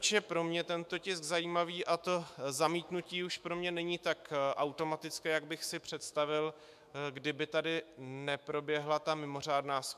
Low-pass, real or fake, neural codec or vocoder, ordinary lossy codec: 14.4 kHz; fake; autoencoder, 48 kHz, 128 numbers a frame, DAC-VAE, trained on Japanese speech; MP3, 96 kbps